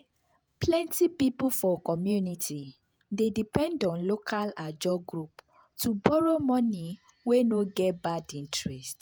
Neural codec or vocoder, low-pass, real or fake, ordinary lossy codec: vocoder, 48 kHz, 128 mel bands, Vocos; none; fake; none